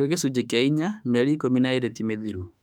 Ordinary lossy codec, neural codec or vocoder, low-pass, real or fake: none; autoencoder, 48 kHz, 32 numbers a frame, DAC-VAE, trained on Japanese speech; 19.8 kHz; fake